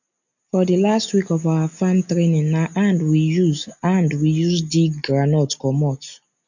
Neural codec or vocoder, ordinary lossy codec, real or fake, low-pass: none; none; real; 7.2 kHz